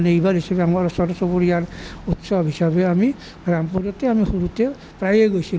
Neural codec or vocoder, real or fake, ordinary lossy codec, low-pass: none; real; none; none